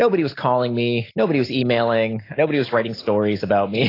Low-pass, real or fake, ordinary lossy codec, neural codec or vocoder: 5.4 kHz; real; AAC, 24 kbps; none